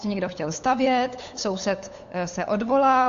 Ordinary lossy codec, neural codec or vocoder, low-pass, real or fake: AAC, 48 kbps; codec, 16 kHz, 8 kbps, FunCodec, trained on LibriTTS, 25 frames a second; 7.2 kHz; fake